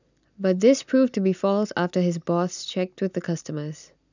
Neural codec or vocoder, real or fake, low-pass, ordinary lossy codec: none; real; 7.2 kHz; none